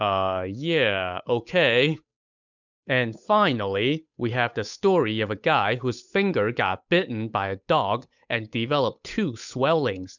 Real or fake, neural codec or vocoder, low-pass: fake; codec, 16 kHz, 8 kbps, FunCodec, trained on Chinese and English, 25 frames a second; 7.2 kHz